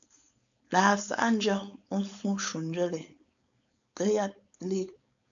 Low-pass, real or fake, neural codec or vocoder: 7.2 kHz; fake; codec, 16 kHz, 4.8 kbps, FACodec